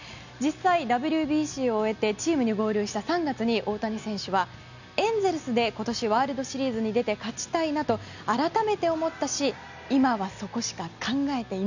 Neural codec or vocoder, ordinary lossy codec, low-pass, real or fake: none; none; 7.2 kHz; real